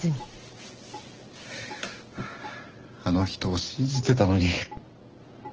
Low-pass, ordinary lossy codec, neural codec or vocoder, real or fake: 7.2 kHz; Opus, 16 kbps; none; real